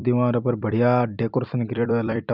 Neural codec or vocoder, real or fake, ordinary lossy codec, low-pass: vocoder, 44.1 kHz, 128 mel bands every 256 samples, BigVGAN v2; fake; none; 5.4 kHz